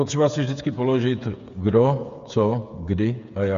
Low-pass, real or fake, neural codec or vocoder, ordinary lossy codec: 7.2 kHz; fake; codec, 16 kHz, 8 kbps, FreqCodec, smaller model; MP3, 96 kbps